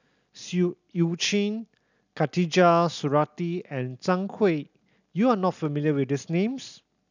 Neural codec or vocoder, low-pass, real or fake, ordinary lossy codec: none; 7.2 kHz; real; none